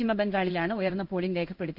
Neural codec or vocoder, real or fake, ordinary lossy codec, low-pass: codec, 16 kHz in and 24 kHz out, 1 kbps, XY-Tokenizer; fake; Opus, 32 kbps; 5.4 kHz